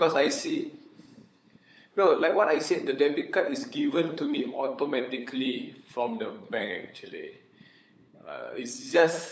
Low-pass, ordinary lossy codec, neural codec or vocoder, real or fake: none; none; codec, 16 kHz, 8 kbps, FunCodec, trained on LibriTTS, 25 frames a second; fake